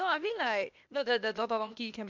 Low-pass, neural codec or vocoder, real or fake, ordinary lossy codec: 7.2 kHz; codec, 16 kHz, 0.8 kbps, ZipCodec; fake; MP3, 64 kbps